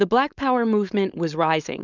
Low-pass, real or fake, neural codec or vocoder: 7.2 kHz; fake; codec, 16 kHz, 4.8 kbps, FACodec